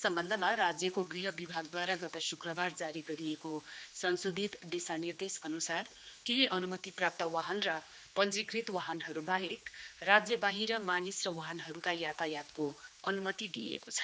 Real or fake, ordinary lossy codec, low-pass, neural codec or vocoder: fake; none; none; codec, 16 kHz, 2 kbps, X-Codec, HuBERT features, trained on general audio